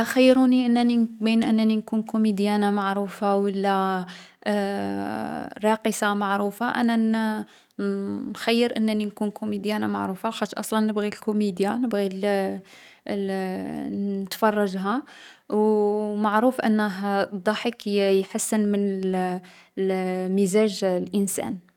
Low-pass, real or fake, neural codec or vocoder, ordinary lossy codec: 19.8 kHz; fake; codec, 44.1 kHz, 7.8 kbps, DAC; none